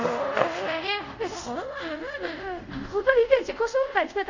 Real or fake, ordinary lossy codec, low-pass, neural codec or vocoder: fake; none; 7.2 kHz; codec, 24 kHz, 0.5 kbps, DualCodec